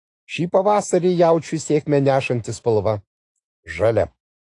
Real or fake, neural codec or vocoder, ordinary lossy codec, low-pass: fake; autoencoder, 48 kHz, 128 numbers a frame, DAC-VAE, trained on Japanese speech; AAC, 48 kbps; 10.8 kHz